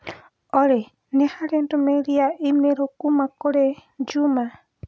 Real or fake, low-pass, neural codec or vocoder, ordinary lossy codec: real; none; none; none